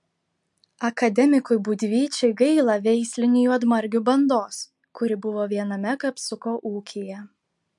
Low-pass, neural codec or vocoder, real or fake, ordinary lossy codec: 10.8 kHz; none; real; MP3, 64 kbps